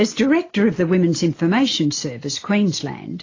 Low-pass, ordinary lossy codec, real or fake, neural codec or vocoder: 7.2 kHz; AAC, 32 kbps; real; none